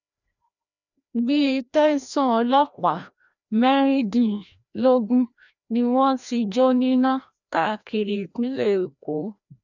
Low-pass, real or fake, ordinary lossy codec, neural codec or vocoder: 7.2 kHz; fake; none; codec, 16 kHz, 1 kbps, FreqCodec, larger model